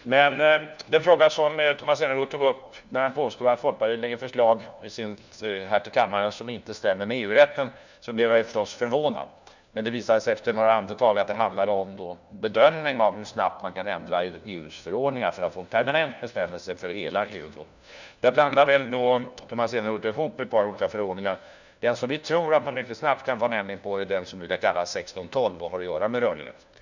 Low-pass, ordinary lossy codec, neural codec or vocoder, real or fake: 7.2 kHz; none; codec, 16 kHz, 1 kbps, FunCodec, trained on LibriTTS, 50 frames a second; fake